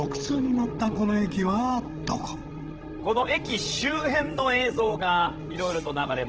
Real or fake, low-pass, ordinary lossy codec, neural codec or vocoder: fake; 7.2 kHz; Opus, 16 kbps; codec, 16 kHz, 16 kbps, FreqCodec, larger model